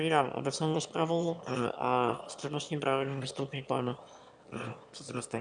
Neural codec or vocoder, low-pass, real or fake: autoencoder, 22.05 kHz, a latent of 192 numbers a frame, VITS, trained on one speaker; 9.9 kHz; fake